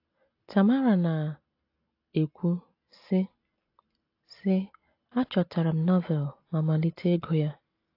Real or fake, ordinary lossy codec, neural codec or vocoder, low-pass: real; AAC, 32 kbps; none; 5.4 kHz